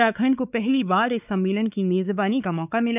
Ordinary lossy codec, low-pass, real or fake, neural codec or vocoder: none; 3.6 kHz; fake; codec, 16 kHz, 4 kbps, X-Codec, WavLM features, trained on Multilingual LibriSpeech